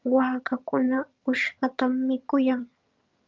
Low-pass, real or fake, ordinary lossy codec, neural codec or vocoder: 7.2 kHz; fake; Opus, 24 kbps; vocoder, 22.05 kHz, 80 mel bands, HiFi-GAN